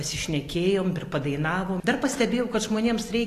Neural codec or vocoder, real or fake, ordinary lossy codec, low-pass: none; real; AAC, 48 kbps; 14.4 kHz